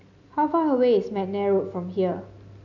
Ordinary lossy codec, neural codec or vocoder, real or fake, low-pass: none; none; real; 7.2 kHz